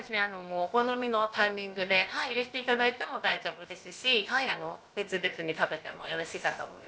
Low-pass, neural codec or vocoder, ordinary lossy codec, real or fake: none; codec, 16 kHz, about 1 kbps, DyCAST, with the encoder's durations; none; fake